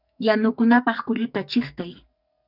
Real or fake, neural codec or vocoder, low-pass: fake; codec, 44.1 kHz, 2.6 kbps, SNAC; 5.4 kHz